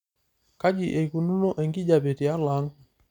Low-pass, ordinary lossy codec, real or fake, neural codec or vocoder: 19.8 kHz; none; real; none